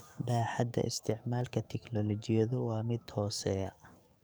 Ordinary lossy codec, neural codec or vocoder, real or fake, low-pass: none; codec, 44.1 kHz, 7.8 kbps, DAC; fake; none